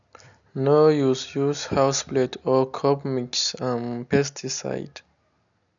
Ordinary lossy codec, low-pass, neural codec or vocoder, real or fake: none; 7.2 kHz; none; real